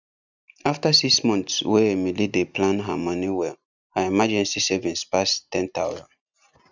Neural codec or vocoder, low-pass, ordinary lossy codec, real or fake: none; 7.2 kHz; none; real